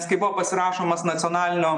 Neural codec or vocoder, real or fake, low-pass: none; real; 10.8 kHz